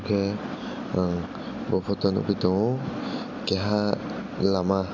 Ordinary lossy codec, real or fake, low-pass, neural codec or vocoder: none; real; 7.2 kHz; none